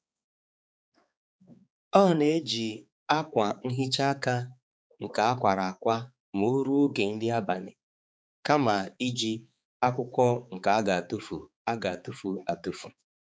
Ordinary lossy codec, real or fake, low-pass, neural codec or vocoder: none; fake; none; codec, 16 kHz, 4 kbps, X-Codec, HuBERT features, trained on balanced general audio